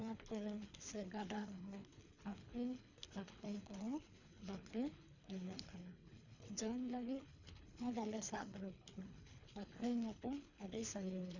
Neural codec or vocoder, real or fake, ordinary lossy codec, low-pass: codec, 24 kHz, 3 kbps, HILCodec; fake; none; 7.2 kHz